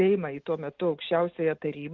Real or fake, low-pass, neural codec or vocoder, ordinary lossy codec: real; 7.2 kHz; none; Opus, 32 kbps